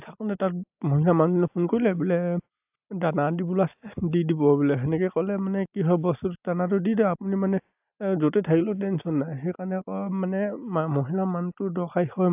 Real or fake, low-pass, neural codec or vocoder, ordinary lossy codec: real; 3.6 kHz; none; AAC, 32 kbps